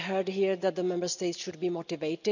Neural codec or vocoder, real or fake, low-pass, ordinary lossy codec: none; real; 7.2 kHz; none